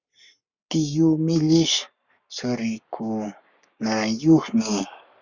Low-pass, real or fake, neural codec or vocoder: 7.2 kHz; fake; codec, 44.1 kHz, 7.8 kbps, Pupu-Codec